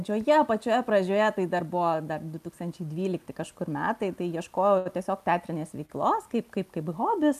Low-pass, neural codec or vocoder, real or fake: 14.4 kHz; none; real